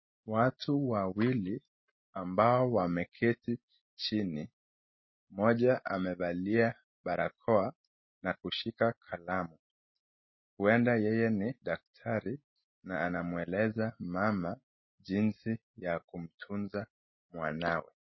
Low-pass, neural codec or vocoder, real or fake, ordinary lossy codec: 7.2 kHz; none; real; MP3, 24 kbps